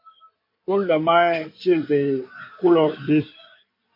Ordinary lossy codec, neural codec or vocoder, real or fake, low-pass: MP3, 32 kbps; codec, 16 kHz in and 24 kHz out, 2.2 kbps, FireRedTTS-2 codec; fake; 5.4 kHz